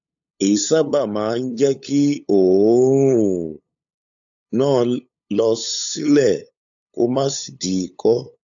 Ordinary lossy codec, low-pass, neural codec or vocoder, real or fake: AAC, 64 kbps; 7.2 kHz; codec, 16 kHz, 8 kbps, FunCodec, trained on LibriTTS, 25 frames a second; fake